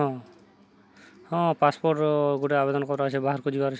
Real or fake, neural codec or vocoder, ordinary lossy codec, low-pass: real; none; none; none